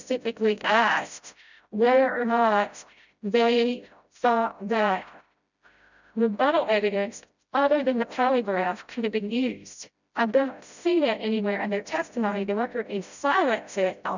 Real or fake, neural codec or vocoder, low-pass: fake; codec, 16 kHz, 0.5 kbps, FreqCodec, smaller model; 7.2 kHz